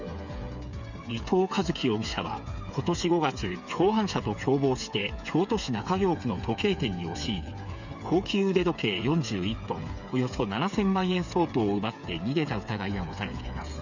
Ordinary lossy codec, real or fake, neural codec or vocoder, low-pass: none; fake; codec, 16 kHz, 8 kbps, FreqCodec, smaller model; 7.2 kHz